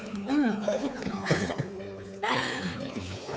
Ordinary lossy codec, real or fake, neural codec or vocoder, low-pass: none; fake; codec, 16 kHz, 4 kbps, X-Codec, WavLM features, trained on Multilingual LibriSpeech; none